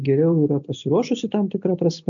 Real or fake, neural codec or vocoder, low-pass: real; none; 7.2 kHz